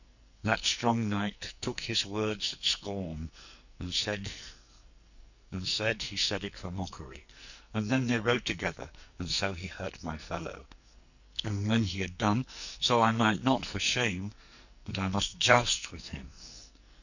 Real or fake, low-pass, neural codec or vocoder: fake; 7.2 kHz; codec, 44.1 kHz, 2.6 kbps, SNAC